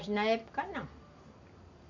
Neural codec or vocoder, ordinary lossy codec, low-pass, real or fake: none; MP3, 64 kbps; 7.2 kHz; real